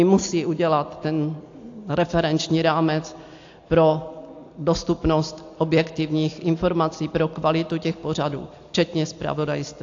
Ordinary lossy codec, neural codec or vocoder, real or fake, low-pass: AAC, 48 kbps; none; real; 7.2 kHz